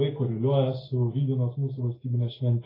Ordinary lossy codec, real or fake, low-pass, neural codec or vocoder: AAC, 24 kbps; real; 5.4 kHz; none